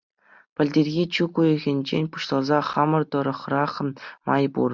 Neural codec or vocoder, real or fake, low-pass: none; real; 7.2 kHz